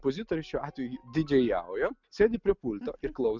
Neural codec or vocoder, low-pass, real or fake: none; 7.2 kHz; real